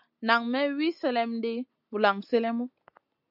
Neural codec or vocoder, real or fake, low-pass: none; real; 5.4 kHz